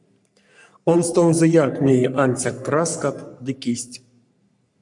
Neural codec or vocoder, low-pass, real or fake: codec, 44.1 kHz, 3.4 kbps, Pupu-Codec; 10.8 kHz; fake